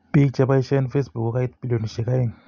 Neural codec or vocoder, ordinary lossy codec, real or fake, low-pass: none; none; real; 7.2 kHz